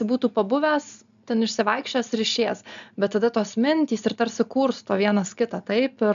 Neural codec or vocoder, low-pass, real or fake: none; 7.2 kHz; real